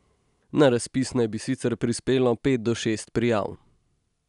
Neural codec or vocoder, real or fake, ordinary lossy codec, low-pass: none; real; none; 10.8 kHz